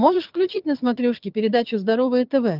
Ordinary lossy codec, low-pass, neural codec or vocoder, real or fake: Opus, 32 kbps; 5.4 kHz; vocoder, 22.05 kHz, 80 mel bands, HiFi-GAN; fake